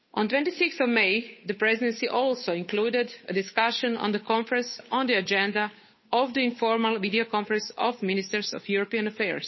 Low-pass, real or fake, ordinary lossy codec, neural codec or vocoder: 7.2 kHz; fake; MP3, 24 kbps; codec, 16 kHz, 8 kbps, FunCodec, trained on Chinese and English, 25 frames a second